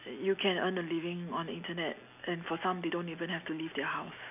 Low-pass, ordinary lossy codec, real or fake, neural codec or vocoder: 3.6 kHz; none; real; none